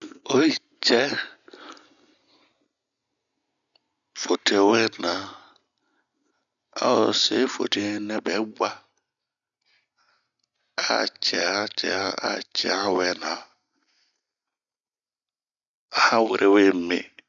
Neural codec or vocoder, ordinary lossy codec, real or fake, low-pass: none; none; real; 7.2 kHz